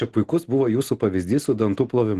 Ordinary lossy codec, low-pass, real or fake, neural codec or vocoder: Opus, 16 kbps; 14.4 kHz; real; none